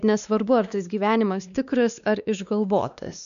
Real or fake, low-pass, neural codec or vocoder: fake; 7.2 kHz; codec, 16 kHz, 2 kbps, X-Codec, WavLM features, trained on Multilingual LibriSpeech